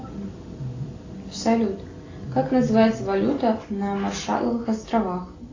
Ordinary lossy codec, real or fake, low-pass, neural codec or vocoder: AAC, 32 kbps; real; 7.2 kHz; none